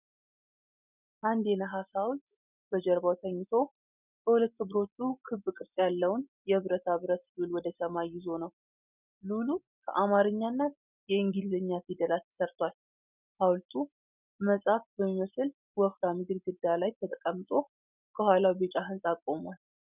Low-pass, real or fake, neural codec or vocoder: 3.6 kHz; real; none